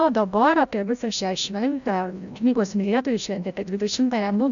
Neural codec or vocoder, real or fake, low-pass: codec, 16 kHz, 0.5 kbps, FreqCodec, larger model; fake; 7.2 kHz